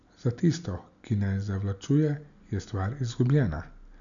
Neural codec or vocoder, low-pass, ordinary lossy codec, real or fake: none; 7.2 kHz; none; real